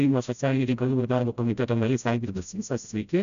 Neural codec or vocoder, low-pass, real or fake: codec, 16 kHz, 0.5 kbps, FreqCodec, smaller model; 7.2 kHz; fake